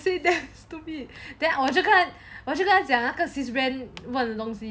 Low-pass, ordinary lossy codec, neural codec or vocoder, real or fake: none; none; none; real